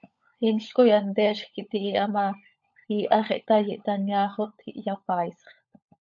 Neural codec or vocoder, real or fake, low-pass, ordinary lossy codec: codec, 16 kHz, 16 kbps, FunCodec, trained on LibriTTS, 50 frames a second; fake; 7.2 kHz; AAC, 64 kbps